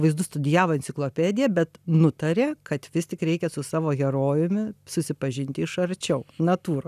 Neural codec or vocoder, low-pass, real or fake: none; 14.4 kHz; real